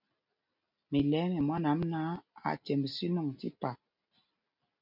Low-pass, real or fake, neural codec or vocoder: 5.4 kHz; real; none